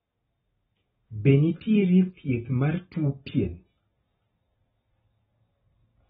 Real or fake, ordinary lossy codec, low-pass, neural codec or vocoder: real; AAC, 16 kbps; 10.8 kHz; none